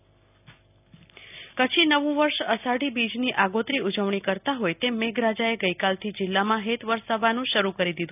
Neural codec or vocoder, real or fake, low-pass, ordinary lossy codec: none; real; 3.6 kHz; none